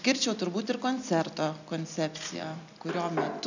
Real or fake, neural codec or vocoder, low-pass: real; none; 7.2 kHz